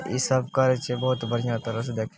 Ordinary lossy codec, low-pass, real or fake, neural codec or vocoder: none; none; real; none